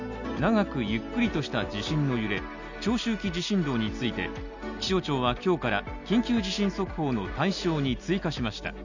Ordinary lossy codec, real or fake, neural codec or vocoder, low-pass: none; real; none; 7.2 kHz